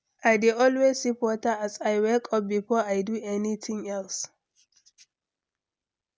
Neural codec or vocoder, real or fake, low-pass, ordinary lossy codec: none; real; none; none